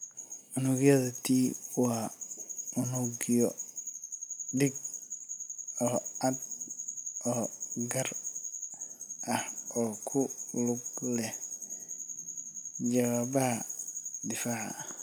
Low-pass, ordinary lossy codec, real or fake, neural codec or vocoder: none; none; real; none